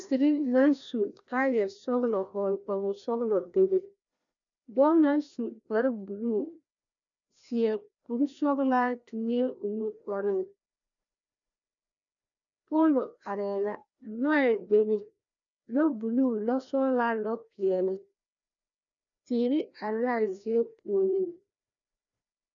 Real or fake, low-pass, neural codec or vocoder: fake; 7.2 kHz; codec, 16 kHz, 1 kbps, FreqCodec, larger model